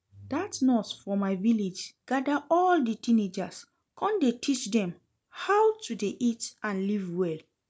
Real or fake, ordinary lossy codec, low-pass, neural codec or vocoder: real; none; none; none